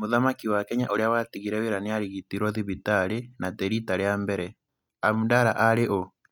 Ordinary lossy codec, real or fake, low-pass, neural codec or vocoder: none; real; 19.8 kHz; none